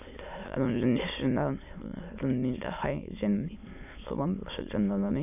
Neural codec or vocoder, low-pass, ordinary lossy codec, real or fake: autoencoder, 22.05 kHz, a latent of 192 numbers a frame, VITS, trained on many speakers; 3.6 kHz; none; fake